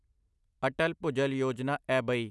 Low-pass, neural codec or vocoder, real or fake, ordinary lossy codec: none; none; real; none